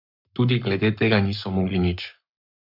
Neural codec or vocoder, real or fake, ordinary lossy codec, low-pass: codec, 44.1 kHz, 7.8 kbps, Pupu-Codec; fake; none; 5.4 kHz